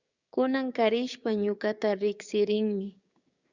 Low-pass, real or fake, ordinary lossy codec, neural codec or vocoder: 7.2 kHz; fake; Opus, 64 kbps; codec, 16 kHz, 6 kbps, DAC